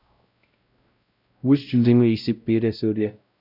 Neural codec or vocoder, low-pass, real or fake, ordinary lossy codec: codec, 16 kHz, 0.5 kbps, X-Codec, WavLM features, trained on Multilingual LibriSpeech; 5.4 kHz; fake; none